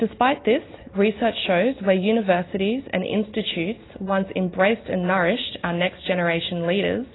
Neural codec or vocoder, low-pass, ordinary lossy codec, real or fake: none; 7.2 kHz; AAC, 16 kbps; real